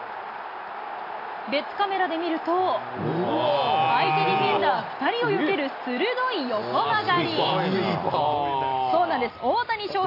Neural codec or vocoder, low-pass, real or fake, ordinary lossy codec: none; 5.4 kHz; real; AAC, 32 kbps